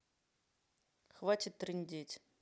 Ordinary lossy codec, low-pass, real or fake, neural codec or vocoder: none; none; real; none